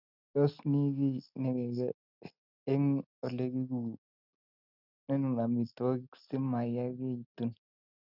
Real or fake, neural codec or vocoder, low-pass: real; none; 5.4 kHz